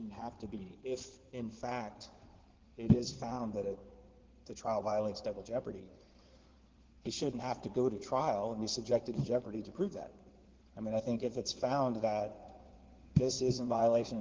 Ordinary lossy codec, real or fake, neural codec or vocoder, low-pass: Opus, 16 kbps; fake; codec, 16 kHz in and 24 kHz out, 2.2 kbps, FireRedTTS-2 codec; 7.2 kHz